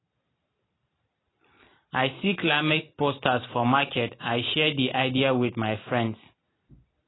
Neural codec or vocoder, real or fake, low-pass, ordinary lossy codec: none; real; 7.2 kHz; AAC, 16 kbps